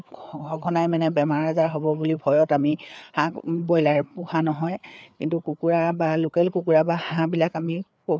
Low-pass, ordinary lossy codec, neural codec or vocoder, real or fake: none; none; codec, 16 kHz, 4 kbps, FreqCodec, larger model; fake